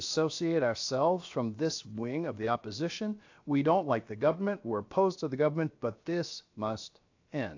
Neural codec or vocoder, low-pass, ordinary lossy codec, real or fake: codec, 16 kHz, 0.7 kbps, FocalCodec; 7.2 kHz; AAC, 48 kbps; fake